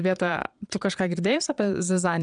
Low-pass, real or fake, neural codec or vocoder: 9.9 kHz; fake; vocoder, 22.05 kHz, 80 mel bands, Vocos